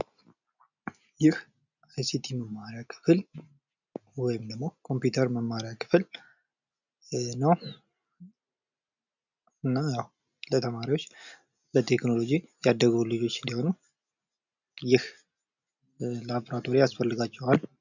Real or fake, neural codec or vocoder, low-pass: real; none; 7.2 kHz